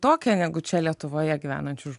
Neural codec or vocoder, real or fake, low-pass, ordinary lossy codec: none; real; 10.8 kHz; MP3, 96 kbps